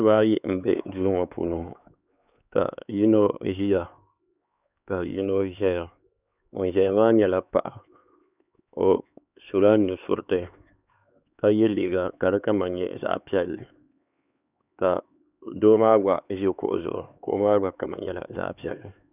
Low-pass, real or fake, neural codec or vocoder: 3.6 kHz; fake; codec, 16 kHz, 4 kbps, X-Codec, HuBERT features, trained on LibriSpeech